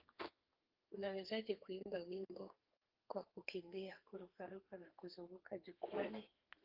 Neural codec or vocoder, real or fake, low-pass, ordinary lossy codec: codec, 32 kHz, 1.9 kbps, SNAC; fake; 5.4 kHz; Opus, 24 kbps